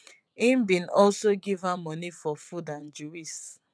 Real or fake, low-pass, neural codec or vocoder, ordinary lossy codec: fake; none; vocoder, 22.05 kHz, 80 mel bands, WaveNeXt; none